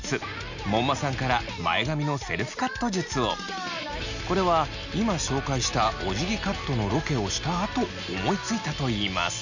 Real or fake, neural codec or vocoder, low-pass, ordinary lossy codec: real; none; 7.2 kHz; none